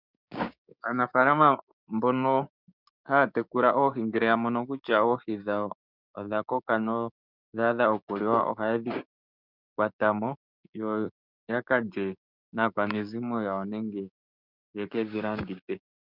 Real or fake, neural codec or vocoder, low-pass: fake; codec, 16 kHz, 6 kbps, DAC; 5.4 kHz